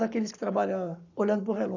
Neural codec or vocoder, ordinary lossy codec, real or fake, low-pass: codec, 44.1 kHz, 7.8 kbps, Pupu-Codec; none; fake; 7.2 kHz